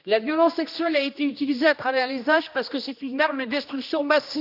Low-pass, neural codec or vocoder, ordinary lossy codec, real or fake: 5.4 kHz; codec, 16 kHz, 1 kbps, X-Codec, HuBERT features, trained on general audio; none; fake